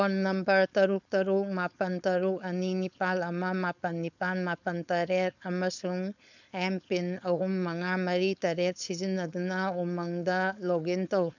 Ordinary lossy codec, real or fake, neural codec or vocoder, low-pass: none; fake; codec, 16 kHz, 4.8 kbps, FACodec; 7.2 kHz